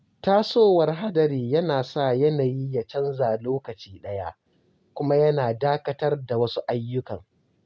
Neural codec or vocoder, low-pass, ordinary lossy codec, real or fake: none; none; none; real